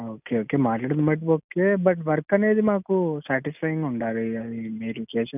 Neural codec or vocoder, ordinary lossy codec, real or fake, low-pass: none; none; real; 3.6 kHz